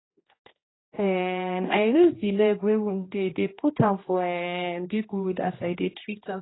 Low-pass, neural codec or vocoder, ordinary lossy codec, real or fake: 7.2 kHz; codec, 16 kHz, 1 kbps, X-Codec, HuBERT features, trained on general audio; AAC, 16 kbps; fake